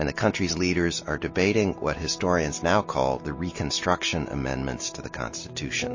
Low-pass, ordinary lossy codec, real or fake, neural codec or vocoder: 7.2 kHz; MP3, 32 kbps; real; none